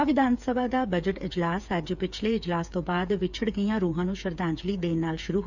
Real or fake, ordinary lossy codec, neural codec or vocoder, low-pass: fake; none; codec, 16 kHz, 8 kbps, FreqCodec, smaller model; 7.2 kHz